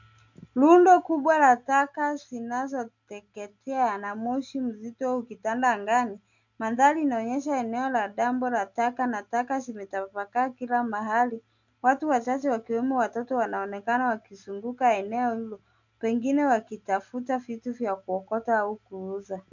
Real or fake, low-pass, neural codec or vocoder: real; 7.2 kHz; none